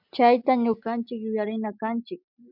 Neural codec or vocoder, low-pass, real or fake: none; 5.4 kHz; real